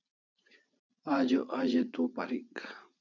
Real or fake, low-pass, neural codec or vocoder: fake; 7.2 kHz; vocoder, 44.1 kHz, 80 mel bands, Vocos